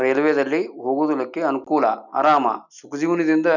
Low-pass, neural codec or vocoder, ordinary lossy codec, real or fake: 7.2 kHz; none; none; real